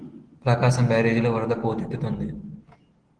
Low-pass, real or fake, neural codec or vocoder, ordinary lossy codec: 9.9 kHz; real; none; Opus, 16 kbps